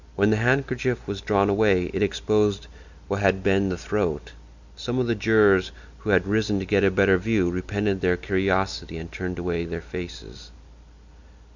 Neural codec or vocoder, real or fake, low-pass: none; real; 7.2 kHz